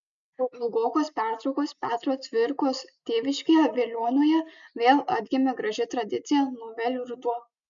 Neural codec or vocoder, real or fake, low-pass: none; real; 7.2 kHz